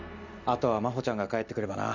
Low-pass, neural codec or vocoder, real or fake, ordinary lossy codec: 7.2 kHz; none; real; none